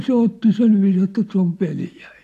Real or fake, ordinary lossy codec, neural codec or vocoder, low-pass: real; AAC, 64 kbps; none; 14.4 kHz